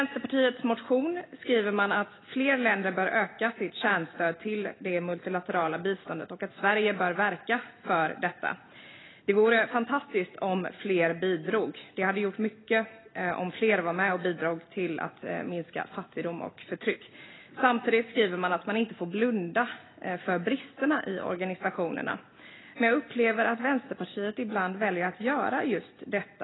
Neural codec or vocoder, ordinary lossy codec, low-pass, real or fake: none; AAC, 16 kbps; 7.2 kHz; real